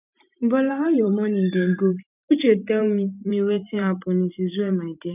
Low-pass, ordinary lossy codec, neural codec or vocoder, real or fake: 3.6 kHz; none; vocoder, 44.1 kHz, 128 mel bands every 512 samples, BigVGAN v2; fake